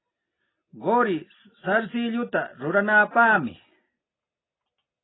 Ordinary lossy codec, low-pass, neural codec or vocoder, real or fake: AAC, 16 kbps; 7.2 kHz; none; real